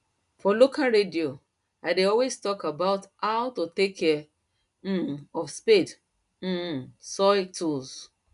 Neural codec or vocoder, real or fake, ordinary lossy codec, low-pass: none; real; none; 10.8 kHz